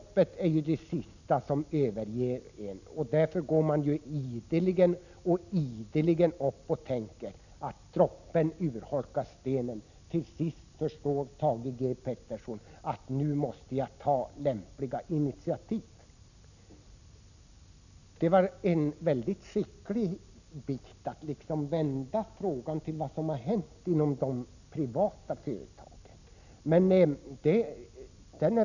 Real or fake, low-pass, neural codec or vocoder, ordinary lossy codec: real; 7.2 kHz; none; none